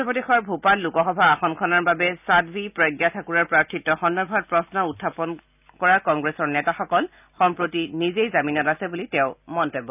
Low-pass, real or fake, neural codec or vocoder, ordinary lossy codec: 3.6 kHz; real; none; none